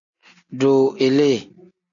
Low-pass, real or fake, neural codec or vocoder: 7.2 kHz; real; none